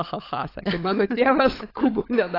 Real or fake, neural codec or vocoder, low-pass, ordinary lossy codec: fake; codec, 16 kHz, 16 kbps, FunCodec, trained on LibriTTS, 50 frames a second; 5.4 kHz; AAC, 24 kbps